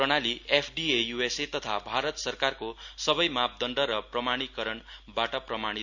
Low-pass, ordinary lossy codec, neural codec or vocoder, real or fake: 7.2 kHz; none; none; real